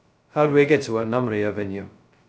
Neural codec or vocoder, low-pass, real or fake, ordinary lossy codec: codec, 16 kHz, 0.2 kbps, FocalCodec; none; fake; none